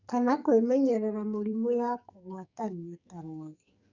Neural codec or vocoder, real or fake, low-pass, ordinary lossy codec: codec, 44.1 kHz, 2.6 kbps, SNAC; fake; 7.2 kHz; none